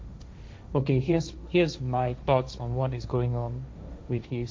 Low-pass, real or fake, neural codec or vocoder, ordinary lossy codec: none; fake; codec, 16 kHz, 1.1 kbps, Voila-Tokenizer; none